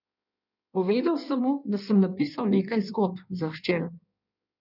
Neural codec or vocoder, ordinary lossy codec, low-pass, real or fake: codec, 16 kHz in and 24 kHz out, 1.1 kbps, FireRedTTS-2 codec; none; 5.4 kHz; fake